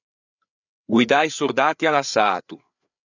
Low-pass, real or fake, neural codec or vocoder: 7.2 kHz; fake; codec, 16 kHz, 4 kbps, FreqCodec, larger model